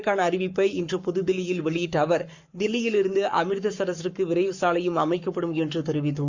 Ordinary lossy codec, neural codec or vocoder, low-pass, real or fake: Opus, 64 kbps; codec, 44.1 kHz, 7.8 kbps, Pupu-Codec; 7.2 kHz; fake